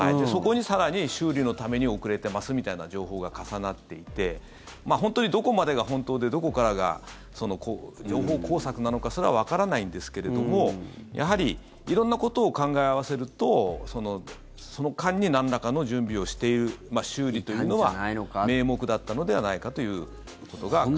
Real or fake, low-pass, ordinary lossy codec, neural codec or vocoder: real; none; none; none